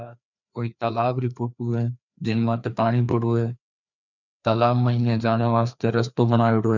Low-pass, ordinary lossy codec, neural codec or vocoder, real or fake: 7.2 kHz; none; codec, 16 kHz, 2 kbps, FreqCodec, larger model; fake